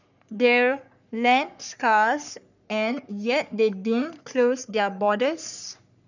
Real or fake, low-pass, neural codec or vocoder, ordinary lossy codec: fake; 7.2 kHz; codec, 44.1 kHz, 3.4 kbps, Pupu-Codec; none